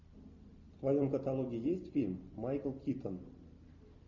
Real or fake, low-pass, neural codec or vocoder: real; 7.2 kHz; none